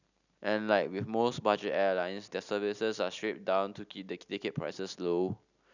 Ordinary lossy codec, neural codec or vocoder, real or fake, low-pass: none; none; real; 7.2 kHz